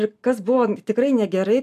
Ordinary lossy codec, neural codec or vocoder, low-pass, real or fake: MP3, 96 kbps; none; 14.4 kHz; real